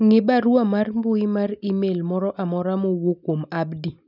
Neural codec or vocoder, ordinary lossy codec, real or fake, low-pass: none; none; real; 5.4 kHz